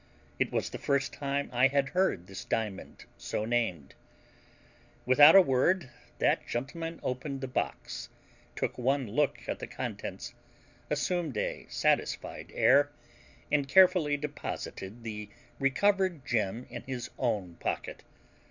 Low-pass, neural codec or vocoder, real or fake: 7.2 kHz; none; real